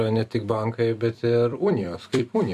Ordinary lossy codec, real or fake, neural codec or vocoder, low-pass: MP3, 64 kbps; fake; vocoder, 44.1 kHz, 128 mel bands every 256 samples, BigVGAN v2; 14.4 kHz